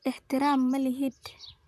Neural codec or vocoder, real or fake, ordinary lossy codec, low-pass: vocoder, 44.1 kHz, 128 mel bands, Pupu-Vocoder; fake; none; 14.4 kHz